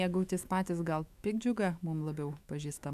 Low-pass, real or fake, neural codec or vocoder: 14.4 kHz; fake; autoencoder, 48 kHz, 128 numbers a frame, DAC-VAE, trained on Japanese speech